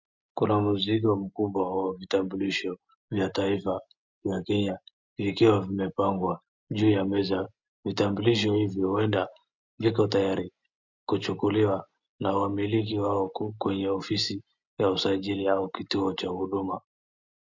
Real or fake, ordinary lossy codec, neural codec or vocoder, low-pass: real; MP3, 64 kbps; none; 7.2 kHz